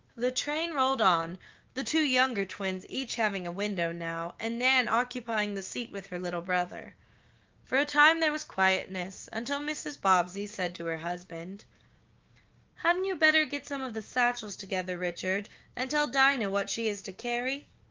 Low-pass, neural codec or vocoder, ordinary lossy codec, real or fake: 7.2 kHz; codec, 16 kHz, 6 kbps, DAC; Opus, 32 kbps; fake